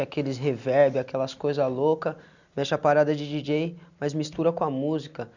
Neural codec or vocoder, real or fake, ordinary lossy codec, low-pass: none; real; none; 7.2 kHz